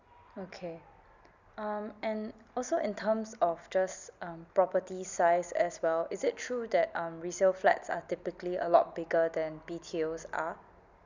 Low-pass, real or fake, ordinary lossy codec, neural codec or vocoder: 7.2 kHz; real; none; none